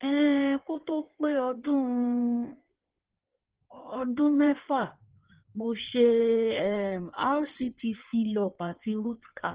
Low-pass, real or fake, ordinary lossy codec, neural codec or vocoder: 3.6 kHz; fake; Opus, 16 kbps; codec, 16 kHz in and 24 kHz out, 1.1 kbps, FireRedTTS-2 codec